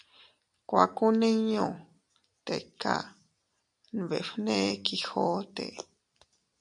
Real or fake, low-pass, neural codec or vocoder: real; 10.8 kHz; none